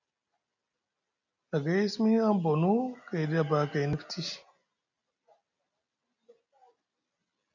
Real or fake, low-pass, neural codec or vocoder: real; 7.2 kHz; none